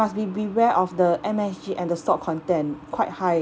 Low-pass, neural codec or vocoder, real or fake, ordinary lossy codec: none; none; real; none